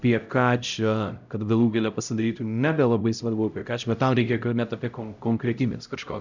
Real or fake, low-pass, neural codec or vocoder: fake; 7.2 kHz; codec, 16 kHz, 0.5 kbps, X-Codec, HuBERT features, trained on LibriSpeech